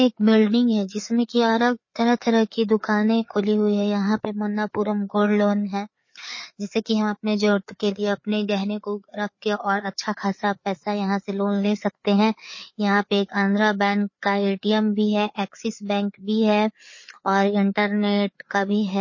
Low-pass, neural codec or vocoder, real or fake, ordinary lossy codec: 7.2 kHz; codec, 16 kHz, 4 kbps, FreqCodec, larger model; fake; MP3, 32 kbps